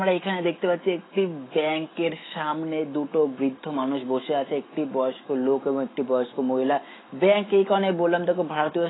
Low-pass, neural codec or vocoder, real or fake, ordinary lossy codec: 7.2 kHz; autoencoder, 48 kHz, 128 numbers a frame, DAC-VAE, trained on Japanese speech; fake; AAC, 16 kbps